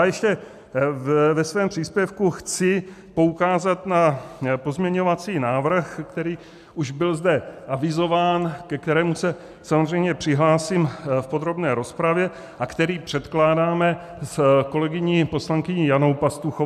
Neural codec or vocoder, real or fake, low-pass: none; real; 14.4 kHz